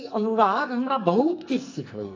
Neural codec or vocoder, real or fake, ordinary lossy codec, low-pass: codec, 44.1 kHz, 2.6 kbps, SNAC; fake; AAC, 48 kbps; 7.2 kHz